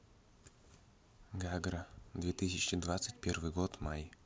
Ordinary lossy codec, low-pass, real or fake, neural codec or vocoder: none; none; real; none